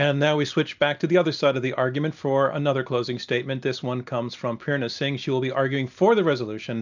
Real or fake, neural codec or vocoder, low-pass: real; none; 7.2 kHz